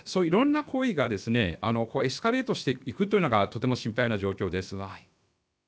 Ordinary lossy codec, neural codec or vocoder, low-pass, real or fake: none; codec, 16 kHz, about 1 kbps, DyCAST, with the encoder's durations; none; fake